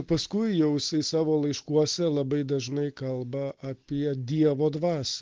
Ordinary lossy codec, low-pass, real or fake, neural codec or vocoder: Opus, 16 kbps; 7.2 kHz; real; none